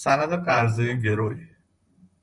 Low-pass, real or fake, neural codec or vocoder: 10.8 kHz; fake; vocoder, 44.1 kHz, 128 mel bands, Pupu-Vocoder